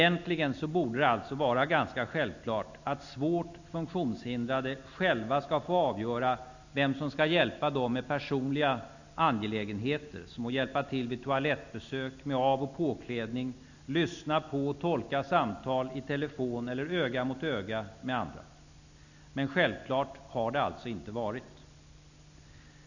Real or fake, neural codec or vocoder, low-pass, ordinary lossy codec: real; none; 7.2 kHz; none